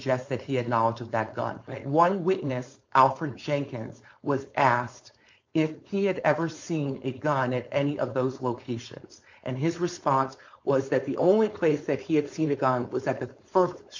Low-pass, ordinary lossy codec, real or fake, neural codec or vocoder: 7.2 kHz; MP3, 48 kbps; fake; codec, 16 kHz, 4.8 kbps, FACodec